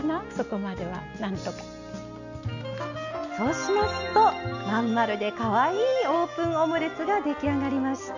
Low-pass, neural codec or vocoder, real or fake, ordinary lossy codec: 7.2 kHz; none; real; none